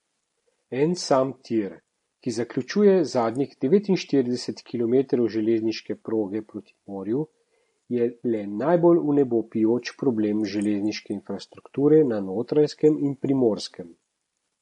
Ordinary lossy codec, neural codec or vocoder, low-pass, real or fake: MP3, 48 kbps; none; 10.8 kHz; real